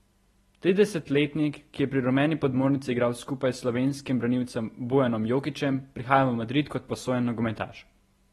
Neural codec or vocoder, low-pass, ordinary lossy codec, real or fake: none; 19.8 kHz; AAC, 32 kbps; real